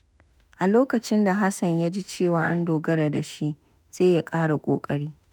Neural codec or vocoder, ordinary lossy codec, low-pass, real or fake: autoencoder, 48 kHz, 32 numbers a frame, DAC-VAE, trained on Japanese speech; none; none; fake